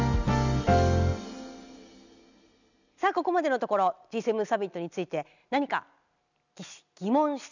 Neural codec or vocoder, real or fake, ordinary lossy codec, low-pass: none; real; none; 7.2 kHz